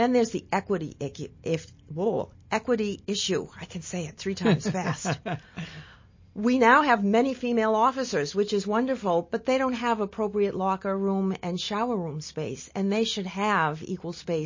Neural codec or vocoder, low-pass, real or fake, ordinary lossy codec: none; 7.2 kHz; real; MP3, 32 kbps